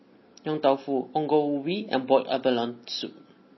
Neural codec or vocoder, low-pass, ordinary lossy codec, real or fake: none; 7.2 kHz; MP3, 24 kbps; real